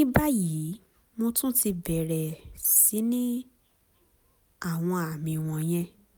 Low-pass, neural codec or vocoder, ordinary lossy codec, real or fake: none; none; none; real